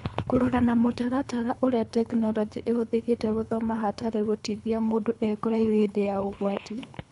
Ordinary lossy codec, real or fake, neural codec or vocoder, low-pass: none; fake; codec, 24 kHz, 3 kbps, HILCodec; 10.8 kHz